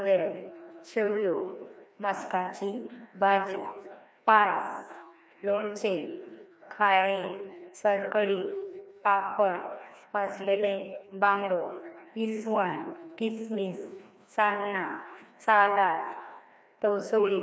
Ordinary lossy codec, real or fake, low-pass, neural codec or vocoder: none; fake; none; codec, 16 kHz, 1 kbps, FreqCodec, larger model